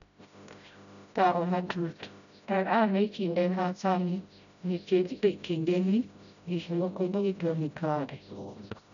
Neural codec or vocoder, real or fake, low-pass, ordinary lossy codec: codec, 16 kHz, 0.5 kbps, FreqCodec, smaller model; fake; 7.2 kHz; none